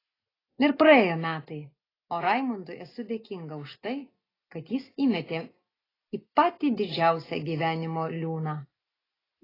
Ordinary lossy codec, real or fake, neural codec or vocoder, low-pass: AAC, 24 kbps; real; none; 5.4 kHz